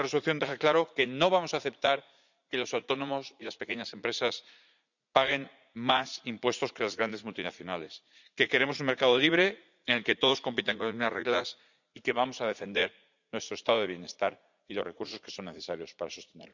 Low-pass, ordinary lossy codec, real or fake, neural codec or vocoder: 7.2 kHz; none; fake; vocoder, 44.1 kHz, 80 mel bands, Vocos